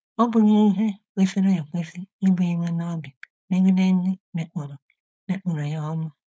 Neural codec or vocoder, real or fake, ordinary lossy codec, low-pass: codec, 16 kHz, 4.8 kbps, FACodec; fake; none; none